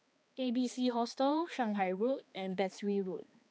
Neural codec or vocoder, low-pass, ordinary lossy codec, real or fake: codec, 16 kHz, 2 kbps, X-Codec, HuBERT features, trained on balanced general audio; none; none; fake